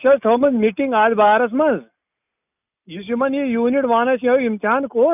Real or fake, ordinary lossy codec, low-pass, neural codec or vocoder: real; none; 3.6 kHz; none